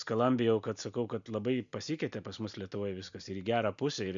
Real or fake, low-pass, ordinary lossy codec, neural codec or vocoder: real; 7.2 kHz; MP3, 64 kbps; none